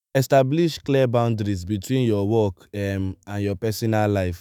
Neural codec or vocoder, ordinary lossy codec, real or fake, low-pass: autoencoder, 48 kHz, 128 numbers a frame, DAC-VAE, trained on Japanese speech; none; fake; none